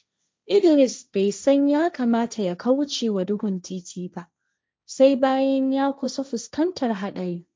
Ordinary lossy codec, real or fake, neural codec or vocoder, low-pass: none; fake; codec, 16 kHz, 1.1 kbps, Voila-Tokenizer; none